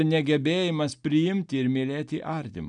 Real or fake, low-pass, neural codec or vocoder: real; 9.9 kHz; none